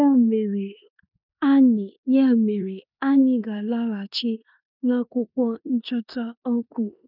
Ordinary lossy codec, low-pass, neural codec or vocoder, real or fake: none; 5.4 kHz; codec, 16 kHz in and 24 kHz out, 0.9 kbps, LongCat-Audio-Codec, four codebook decoder; fake